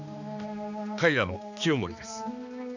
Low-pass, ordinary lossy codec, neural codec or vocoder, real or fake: 7.2 kHz; none; codec, 16 kHz, 2 kbps, X-Codec, HuBERT features, trained on balanced general audio; fake